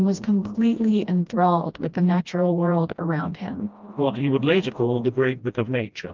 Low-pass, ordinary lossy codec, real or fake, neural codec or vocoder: 7.2 kHz; Opus, 24 kbps; fake; codec, 16 kHz, 1 kbps, FreqCodec, smaller model